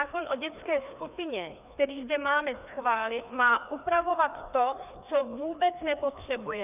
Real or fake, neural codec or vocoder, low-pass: fake; codec, 16 kHz, 2 kbps, FreqCodec, larger model; 3.6 kHz